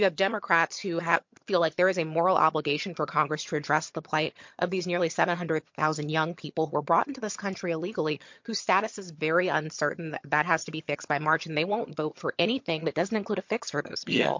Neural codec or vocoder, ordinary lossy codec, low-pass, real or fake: vocoder, 22.05 kHz, 80 mel bands, HiFi-GAN; MP3, 48 kbps; 7.2 kHz; fake